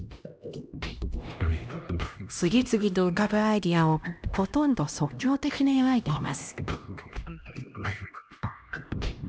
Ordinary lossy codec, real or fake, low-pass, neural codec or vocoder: none; fake; none; codec, 16 kHz, 1 kbps, X-Codec, HuBERT features, trained on LibriSpeech